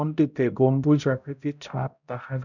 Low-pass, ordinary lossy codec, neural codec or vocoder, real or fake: 7.2 kHz; none; codec, 16 kHz, 0.5 kbps, X-Codec, HuBERT features, trained on balanced general audio; fake